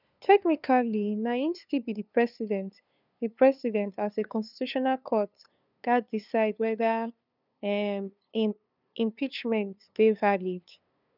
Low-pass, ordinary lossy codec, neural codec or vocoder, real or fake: 5.4 kHz; none; codec, 16 kHz, 2 kbps, FunCodec, trained on LibriTTS, 25 frames a second; fake